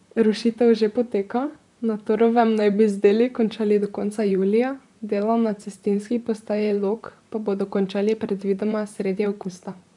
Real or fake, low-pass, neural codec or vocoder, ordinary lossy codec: fake; 10.8 kHz; vocoder, 44.1 kHz, 128 mel bands, Pupu-Vocoder; none